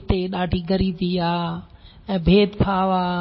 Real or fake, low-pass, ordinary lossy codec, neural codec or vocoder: real; 7.2 kHz; MP3, 24 kbps; none